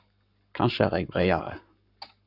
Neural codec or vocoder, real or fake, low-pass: codec, 44.1 kHz, 7.8 kbps, Pupu-Codec; fake; 5.4 kHz